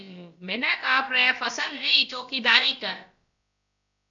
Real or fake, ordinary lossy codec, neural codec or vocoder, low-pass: fake; MP3, 96 kbps; codec, 16 kHz, about 1 kbps, DyCAST, with the encoder's durations; 7.2 kHz